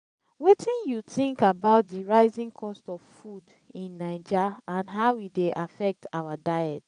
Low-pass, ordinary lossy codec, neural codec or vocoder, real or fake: 9.9 kHz; MP3, 96 kbps; vocoder, 22.05 kHz, 80 mel bands, Vocos; fake